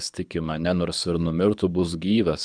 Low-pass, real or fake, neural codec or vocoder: 9.9 kHz; fake; codec, 24 kHz, 6 kbps, HILCodec